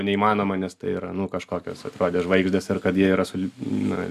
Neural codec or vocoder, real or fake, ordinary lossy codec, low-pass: none; real; AAC, 96 kbps; 14.4 kHz